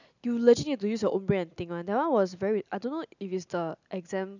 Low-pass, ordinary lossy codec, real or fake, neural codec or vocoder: 7.2 kHz; none; real; none